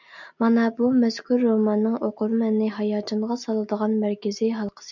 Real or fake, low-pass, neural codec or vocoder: real; 7.2 kHz; none